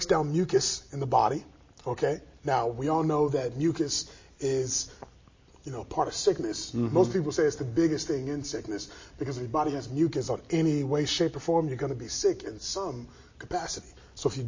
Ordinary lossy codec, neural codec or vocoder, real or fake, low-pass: MP3, 32 kbps; none; real; 7.2 kHz